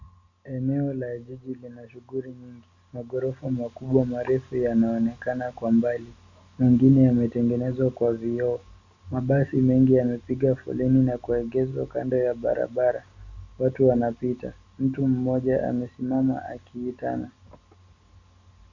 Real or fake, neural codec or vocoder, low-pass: real; none; 7.2 kHz